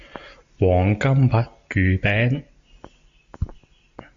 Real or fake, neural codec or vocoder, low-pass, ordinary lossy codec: real; none; 7.2 kHz; Opus, 64 kbps